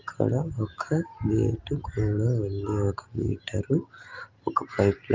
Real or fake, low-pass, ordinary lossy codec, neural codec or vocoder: real; 7.2 kHz; Opus, 24 kbps; none